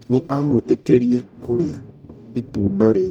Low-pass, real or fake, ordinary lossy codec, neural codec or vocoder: 19.8 kHz; fake; none; codec, 44.1 kHz, 0.9 kbps, DAC